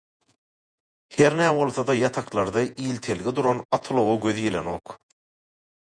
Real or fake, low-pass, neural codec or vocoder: fake; 9.9 kHz; vocoder, 48 kHz, 128 mel bands, Vocos